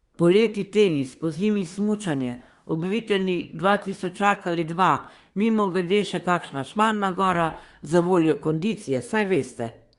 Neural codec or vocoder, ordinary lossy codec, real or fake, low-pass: codec, 24 kHz, 1 kbps, SNAC; Opus, 64 kbps; fake; 10.8 kHz